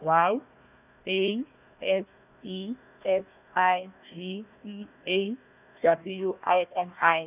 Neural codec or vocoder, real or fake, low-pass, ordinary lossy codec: codec, 16 kHz, 1 kbps, FunCodec, trained on Chinese and English, 50 frames a second; fake; 3.6 kHz; none